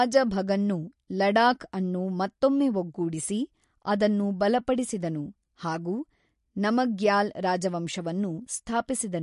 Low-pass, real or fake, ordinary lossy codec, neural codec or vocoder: 14.4 kHz; real; MP3, 48 kbps; none